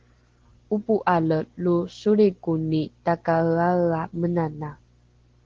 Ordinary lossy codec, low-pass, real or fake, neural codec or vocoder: Opus, 16 kbps; 7.2 kHz; real; none